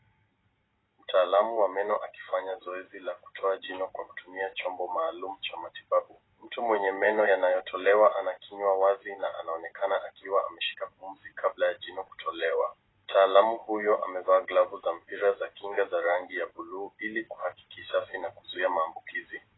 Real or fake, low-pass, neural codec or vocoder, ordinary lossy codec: real; 7.2 kHz; none; AAC, 16 kbps